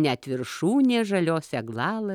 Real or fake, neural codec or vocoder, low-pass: real; none; 19.8 kHz